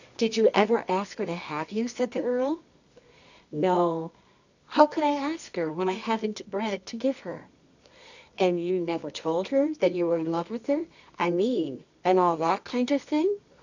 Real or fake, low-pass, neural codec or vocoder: fake; 7.2 kHz; codec, 24 kHz, 0.9 kbps, WavTokenizer, medium music audio release